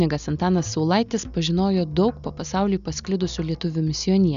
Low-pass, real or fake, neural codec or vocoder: 7.2 kHz; real; none